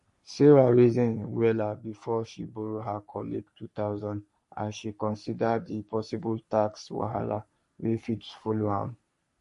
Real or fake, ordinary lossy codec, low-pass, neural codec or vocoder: fake; MP3, 48 kbps; 14.4 kHz; codec, 44.1 kHz, 3.4 kbps, Pupu-Codec